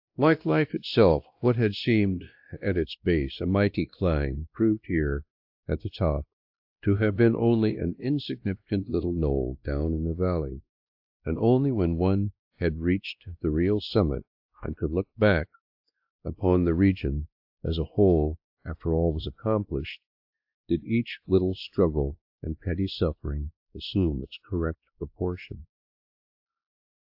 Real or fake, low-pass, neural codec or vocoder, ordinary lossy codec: fake; 5.4 kHz; codec, 16 kHz, 1 kbps, X-Codec, WavLM features, trained on Multilingual LibriSpeech; AAC, 48 kbps